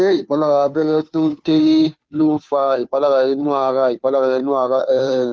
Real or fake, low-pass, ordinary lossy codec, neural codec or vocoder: fake; none; none; codec, 16 kHz, 2 kbps, FunCodec, trained on Chinese and English, 25 frames a second